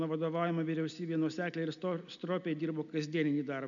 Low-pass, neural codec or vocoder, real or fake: 7.2 kHz; none; real